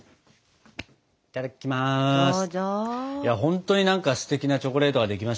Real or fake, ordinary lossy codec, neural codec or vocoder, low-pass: real; none; none; none